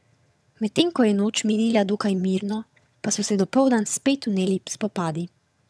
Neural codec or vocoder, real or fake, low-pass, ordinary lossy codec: vocoder, 22.05 kHz, 80 mel bands, HiFi-GAN; fake; none; none